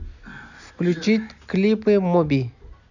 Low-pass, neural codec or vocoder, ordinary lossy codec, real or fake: 7.2 kHz; none; none; real